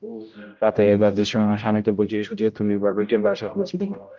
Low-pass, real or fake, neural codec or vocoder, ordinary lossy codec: 7.2 kHz; fake; codec, 16 kHz, 0.5 kbps, X-Codec, HuBERT features, trained on general audio; Opus, 32 kbps